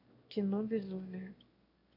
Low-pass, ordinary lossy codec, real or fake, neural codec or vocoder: 5.4 kHz; AAC, 32 kbps; fake; autoencoder, 22.05 kHz, a latent of 192 numbers a frame, VITS, trained on one speaker